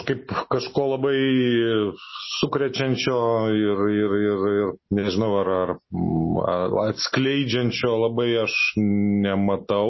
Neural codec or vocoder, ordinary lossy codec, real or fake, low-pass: none; MP3, 24 kbps; real; 7.2 kHz